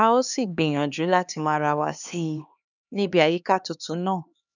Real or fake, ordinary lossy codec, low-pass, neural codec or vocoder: fake; none; 7.2 kHz; codec, 16 kHz, 2 kbps, X-Codec, HuBERT features, trained on LibriSpeech